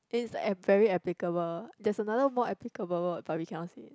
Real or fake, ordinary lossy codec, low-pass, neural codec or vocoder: real; none; none; none